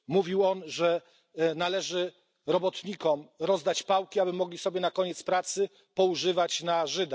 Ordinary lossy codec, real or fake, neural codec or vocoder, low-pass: none; real; none; none